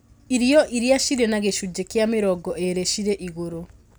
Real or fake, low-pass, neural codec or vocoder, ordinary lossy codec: real; none; none; none